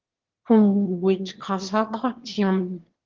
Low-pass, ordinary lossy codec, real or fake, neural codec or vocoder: 7.2 kHz; Opus, 16 kbps; fake; autoencoder, 22.05 kHz, a latent of 192 numbers a frame, VITS, trained on one speaker